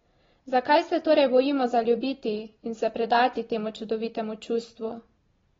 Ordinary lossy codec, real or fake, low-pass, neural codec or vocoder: AAC, 24 kbps; real; 7.2 kHz; none